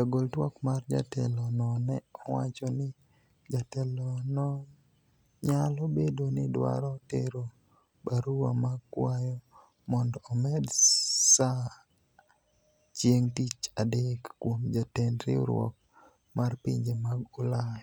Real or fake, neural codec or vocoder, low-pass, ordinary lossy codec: real; none; none; none